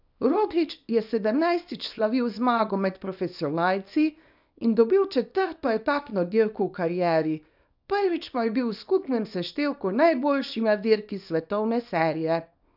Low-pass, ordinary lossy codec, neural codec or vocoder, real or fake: 5.4 kHz; none; codec, 24 kHz, 0.9 kbps, WavTokenizer, small release; fake